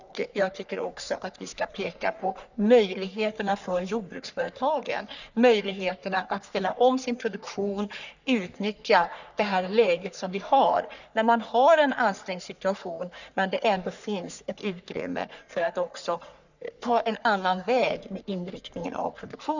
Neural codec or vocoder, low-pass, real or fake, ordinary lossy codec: codec, 44.1 kHz, 3.4 kbps, Pupu-Codec; 7.2 kHz; fake; none